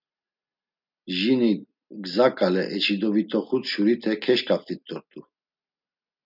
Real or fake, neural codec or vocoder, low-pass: real; none; 5.4 kHz